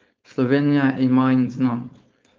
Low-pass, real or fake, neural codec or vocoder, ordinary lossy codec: 7.2 kHz; fake; codec, 16 kHz, 4.8 kbps, FACodec; Opus, 24 kbps